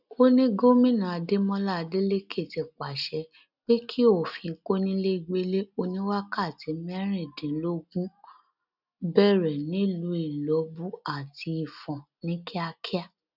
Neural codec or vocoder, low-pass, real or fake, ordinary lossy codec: none; 5.4 kHz; real; none